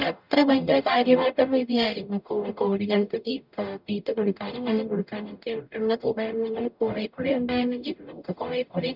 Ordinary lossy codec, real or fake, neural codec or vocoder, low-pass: none; fake; codec, 44.1 kHz, 0.9 kbps, DAC; 5.4 kHz